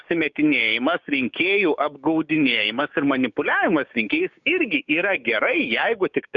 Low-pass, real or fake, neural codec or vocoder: 7.2 kHz; fake; codec, 16 kHz, 6 kbps, DAC